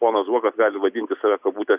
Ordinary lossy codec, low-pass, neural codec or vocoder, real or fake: Opus, 64 kbps; 3.6 kHz; none; real